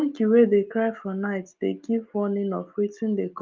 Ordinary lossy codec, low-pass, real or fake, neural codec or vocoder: Opus, 32 kbps; 7.2 kHz; real; none